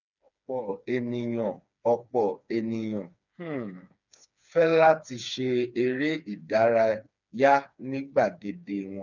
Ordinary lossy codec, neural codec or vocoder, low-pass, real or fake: none; codec, 16 kHz, 4 kbps, FreqCodec, smaller model; 7.2 kHz; fake